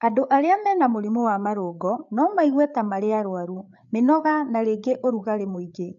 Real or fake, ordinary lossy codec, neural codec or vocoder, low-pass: fake; none; codec, 16 kHz, 8 kbps, FreqCodec, larger model; 7.2 kHz